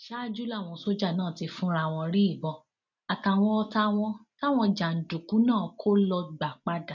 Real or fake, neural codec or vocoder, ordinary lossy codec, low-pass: real; none; none; 7.2 kHz